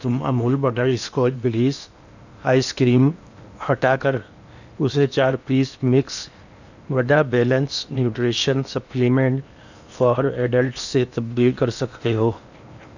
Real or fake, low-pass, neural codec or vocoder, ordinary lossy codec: fake; 7.2 kHz; codec, 16 kHz in and 24 kHz out, 0.8 kbps, FocalCodec, streaming, 65536 codes; none